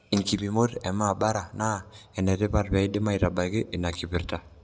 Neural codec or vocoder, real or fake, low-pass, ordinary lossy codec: none; real; none; none